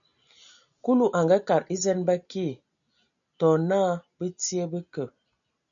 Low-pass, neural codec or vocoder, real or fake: 7.2 kHz; none; real